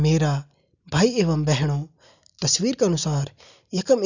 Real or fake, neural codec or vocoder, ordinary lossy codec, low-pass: real; none; none; 7.2 kHz